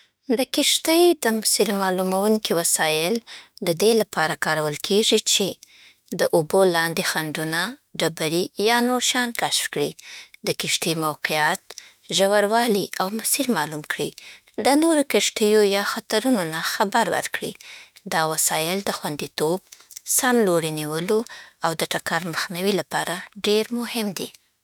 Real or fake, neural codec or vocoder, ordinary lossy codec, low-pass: fake; autoencoder, 48 kHz, 32 numbers a frame, DAC-VAE, trained on Japanese speech; none; none